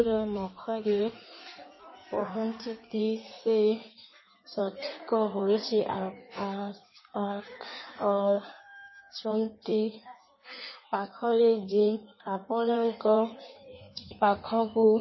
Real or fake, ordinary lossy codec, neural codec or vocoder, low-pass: fake; MP3, 24 kbps; codec, 16 kHz in and 24 kHz out, 1.1 kbps, FireRedTTS-2 codec; 7.2 kHz